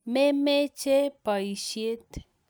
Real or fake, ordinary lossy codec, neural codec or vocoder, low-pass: real; none; none; none